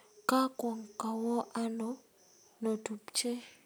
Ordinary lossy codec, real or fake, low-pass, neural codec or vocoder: none; real; none; none